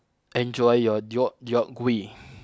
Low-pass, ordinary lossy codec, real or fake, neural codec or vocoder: none; none; real; none